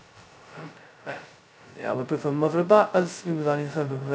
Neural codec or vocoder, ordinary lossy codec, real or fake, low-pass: codec, 16 kHz, 0.2 kbps, FocalCodec; none; fake; none